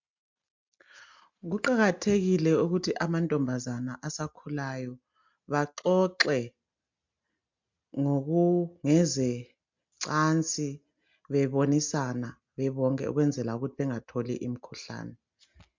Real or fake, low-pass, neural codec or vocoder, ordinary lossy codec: real; 7.2 kHz; none; MP3, 64 kbps